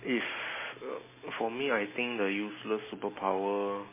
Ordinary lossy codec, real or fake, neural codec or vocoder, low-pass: MP3, 16 kbps; real; none; 3.6 kHz